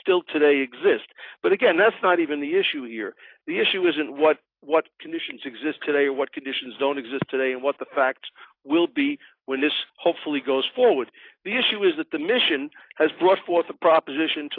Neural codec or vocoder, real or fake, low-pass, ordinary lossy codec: none; real; 5.4 kHz; AAC, 32 kbps